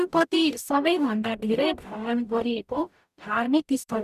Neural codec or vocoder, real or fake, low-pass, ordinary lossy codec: codec, 44.1 kHz, 0.9 kbps, DAC; fake; 14.4 kHz; none